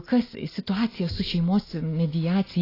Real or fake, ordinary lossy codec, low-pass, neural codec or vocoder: real; AAC, 24 kbps; 5.4 kHz; none